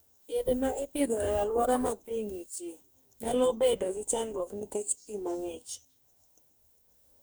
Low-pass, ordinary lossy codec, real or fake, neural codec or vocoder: none; none; fake; codec, 44.1 kHz, 2.6 kbps, DAC